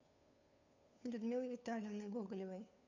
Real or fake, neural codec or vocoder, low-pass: fake; codec, 16 kHz, 2 kbps, FunCodec, trained on Chinese and English, 25 frames a second; 7.2 kHz